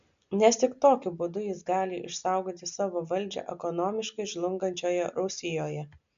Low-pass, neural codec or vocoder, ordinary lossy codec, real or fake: 7.2 kHz; none; MP3, 64 kbps; real